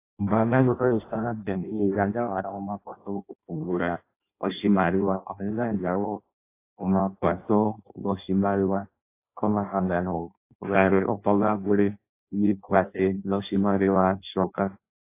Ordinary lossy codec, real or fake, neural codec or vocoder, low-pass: AAC, 24 kbps; fake; codec, 16 kHz in and 24 kHz out, 0.6 kbps, FireRedTTS-2 codec; 3.6 kHz